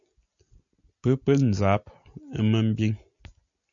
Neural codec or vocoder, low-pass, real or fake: none; 7.2 kHz; real